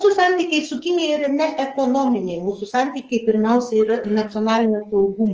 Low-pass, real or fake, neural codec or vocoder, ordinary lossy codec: 7.2 kHz; fake; codec, 44.1 kHz, 2.6 kbps, SNAC; Opus, 24 kbps